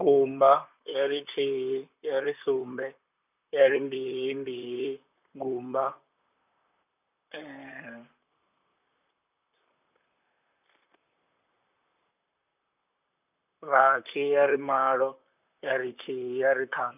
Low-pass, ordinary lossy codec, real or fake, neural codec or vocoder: 3.6 kHz; none; fake; vocoder, 44.1 kHz, 128 mel bands, Pupu-Vocoder